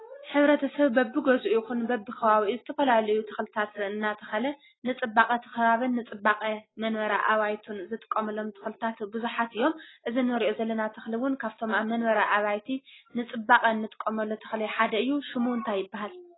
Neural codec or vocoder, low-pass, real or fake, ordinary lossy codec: none; 7.2 kHz; real; AAC, 16 kbps